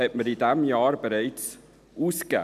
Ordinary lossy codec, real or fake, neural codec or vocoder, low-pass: none; real; none; 14.4 kHz